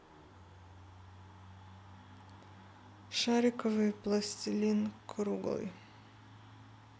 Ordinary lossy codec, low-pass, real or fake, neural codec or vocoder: none; none; real; none